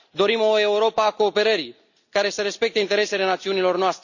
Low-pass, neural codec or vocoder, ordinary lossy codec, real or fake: 7.2 kHz; none; none; real